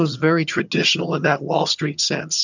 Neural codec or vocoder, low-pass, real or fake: vocoder, 22.05 kHz, 80 mel bands, HiFi-GAN; 7.2 kHz; fake